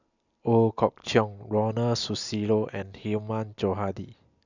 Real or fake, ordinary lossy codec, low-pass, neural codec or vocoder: real; none; 7.2 kHz; none